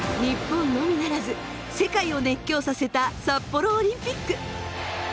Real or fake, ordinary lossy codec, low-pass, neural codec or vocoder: real; none; none; none